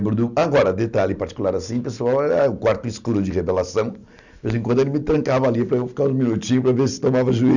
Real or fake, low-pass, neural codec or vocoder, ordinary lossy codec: real; 7.2 kHz; none; none